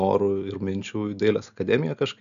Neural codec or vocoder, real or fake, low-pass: none; real; 7.2 kHz